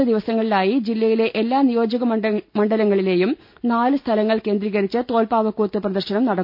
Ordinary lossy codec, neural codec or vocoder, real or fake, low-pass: MP3, 48 kbps; none; real; 5.4 kHz